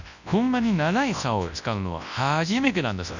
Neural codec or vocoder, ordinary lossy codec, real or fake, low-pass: codec, 24 kHz, 0.9 kbps, WavTokenizer, large speech release; none; fake; 7.2 kHz